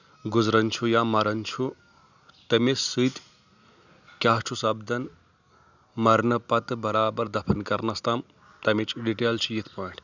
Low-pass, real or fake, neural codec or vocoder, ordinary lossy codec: 7.2 kHz; real; none; none